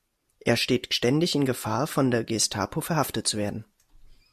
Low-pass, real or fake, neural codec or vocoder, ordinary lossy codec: 14.4 kHz; real; none; AAC, 96 kbps